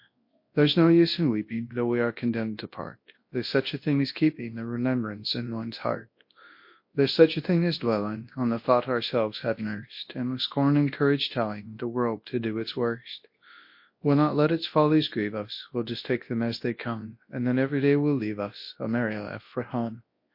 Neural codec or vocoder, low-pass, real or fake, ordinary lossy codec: codec, 24 kHz, 0.9 kbps, WavTokenizer, large speech release; 5.4 kHz; fake; MP3, 32 kbps